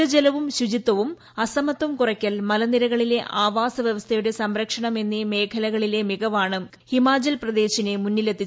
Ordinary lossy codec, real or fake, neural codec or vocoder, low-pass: none; real; none; none